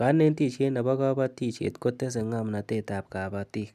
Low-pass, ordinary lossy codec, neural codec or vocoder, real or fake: 14.4 kHz; none; none; real